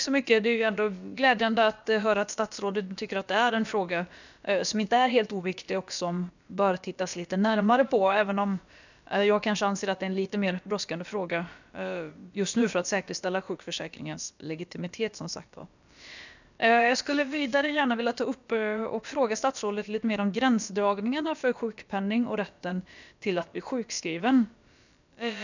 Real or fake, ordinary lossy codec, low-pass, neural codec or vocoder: fake; none; 7.2 kHz; codec, 16 kHz, about 1 kbps, DyCAST, with the encoder's durations